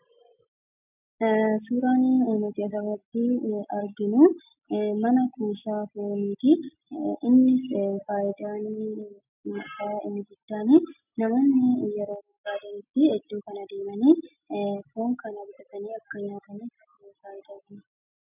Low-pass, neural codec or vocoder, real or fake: 3.6 kHz; none; real